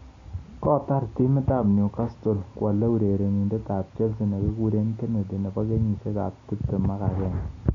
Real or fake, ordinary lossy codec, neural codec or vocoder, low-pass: real; MP3, 64 kbps; none; 7.2 kHz